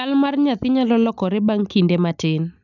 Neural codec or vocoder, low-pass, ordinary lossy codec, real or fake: none; 7.2 kHz; none; real